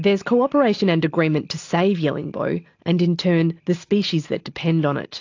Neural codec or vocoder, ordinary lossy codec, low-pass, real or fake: none; AAC, 48 kbps; 7.2 kHz; real